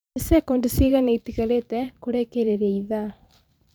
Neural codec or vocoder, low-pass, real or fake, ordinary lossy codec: vocoder, 44.1 kHz, 128 mel bands every 512 samples, BigVGAN v2; none; fake; none